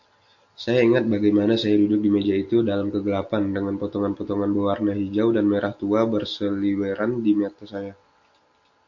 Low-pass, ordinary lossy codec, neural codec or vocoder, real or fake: 7.2 kHz; AAC, 48 kbps; none; real